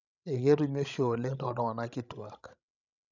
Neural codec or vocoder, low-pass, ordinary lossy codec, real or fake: codec, 16 kHz, 16 kbps, FreqCodec, larger model; 7.2 kHz; none; fake